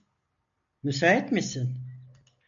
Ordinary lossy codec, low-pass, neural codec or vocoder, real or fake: AAC, 64 kbps; 7.2 kHz; none; real